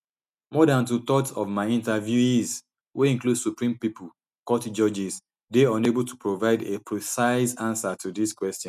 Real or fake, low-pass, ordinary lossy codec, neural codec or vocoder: real; 14.4 kHz; none; none